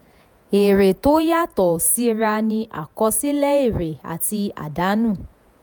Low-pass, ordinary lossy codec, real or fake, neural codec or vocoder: none; none; fake; vocoder, 48 kHz, 128 mel bands, Vocos